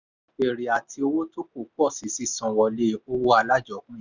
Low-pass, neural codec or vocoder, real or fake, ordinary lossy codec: 7.2 kHz; none; real; none